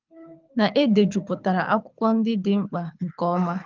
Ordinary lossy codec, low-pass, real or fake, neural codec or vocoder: Opus, 32 kbps; 7.2 kHz; fake; codec, 24 kHz, 6 kbps, HILCodec